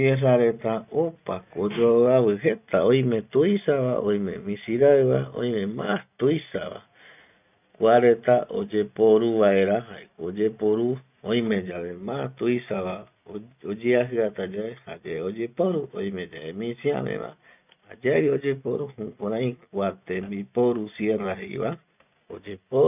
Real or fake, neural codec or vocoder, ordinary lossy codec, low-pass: real; none; none; 3.6 kHz